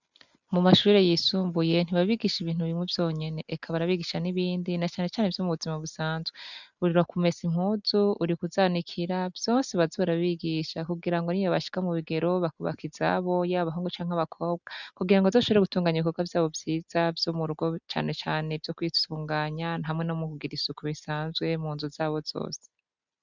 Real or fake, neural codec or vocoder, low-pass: real; none; 7.2 kHz